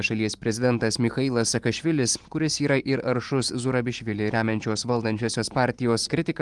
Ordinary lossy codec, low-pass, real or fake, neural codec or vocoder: Opus, 24 kbps; 10.8 kHz; fake; vocoder, 44.1 kHz, 128 mel bands every 512 samples, BigVGAN v2